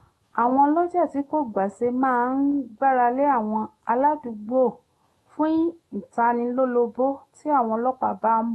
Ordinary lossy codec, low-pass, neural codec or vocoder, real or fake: AAC, 32 kbps; 19.8 kHz; autoencoder, 48 kHz, 128 numbers a frame, DAC-VAE, trained on Japanese speech; fake